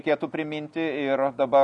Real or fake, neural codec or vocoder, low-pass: real; none; 10.8 kHz